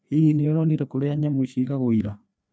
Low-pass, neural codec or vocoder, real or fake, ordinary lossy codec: none; codec, 16 kHz, 2 kbps, FreqCodec, larger model; fake; none